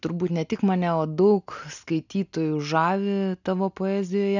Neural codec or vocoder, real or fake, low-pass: none; real; 7.2 kHz